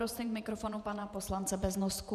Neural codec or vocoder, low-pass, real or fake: vocoder, 48 kHz, 128 mel bands, Vocos; 14.4 kHz; fake